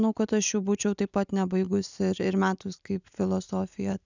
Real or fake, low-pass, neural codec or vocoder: real; 7.2 kHz; none